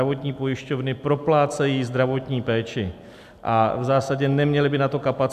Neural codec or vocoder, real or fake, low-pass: none; real; 14.4 kHz